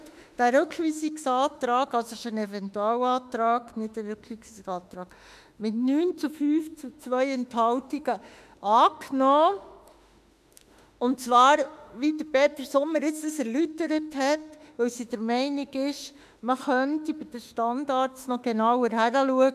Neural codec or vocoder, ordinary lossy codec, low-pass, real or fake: autoencoder, 48 kHz, 32 numbers a frame, DAC-VAE, trained on Japanese speech; none; 14.4 kHz; fake